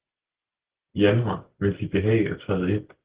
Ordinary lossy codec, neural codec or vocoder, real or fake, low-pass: Opus, 16 kbps; none; real; 3.6 kHz